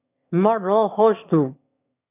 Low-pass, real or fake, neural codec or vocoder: 3.6 kHz; fake; autoencoder, 22.05 kHz, a latent of 192 numbers a frame, VITS, trained on one speaker